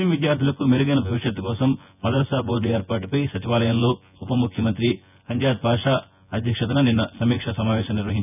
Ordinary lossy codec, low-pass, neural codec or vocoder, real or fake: none; 3.6 kHz; vocoder, 24 kHz, 100 mel bands, Vocos; fake